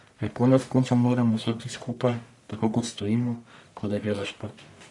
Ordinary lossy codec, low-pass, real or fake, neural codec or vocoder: none; 10.8 kHz; fake; codec, 44.1 kHz, 1.7 kbps, Pupu-Codec